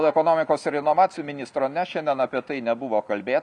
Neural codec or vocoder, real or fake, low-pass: none; real; 10.8 kHz